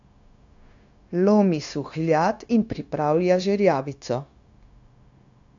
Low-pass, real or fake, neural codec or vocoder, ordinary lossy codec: 7.2 kHz; fake; codec, 16 kHz, 0.9 kbps, LongCat-Audio-Codec; AAC, 64 kbps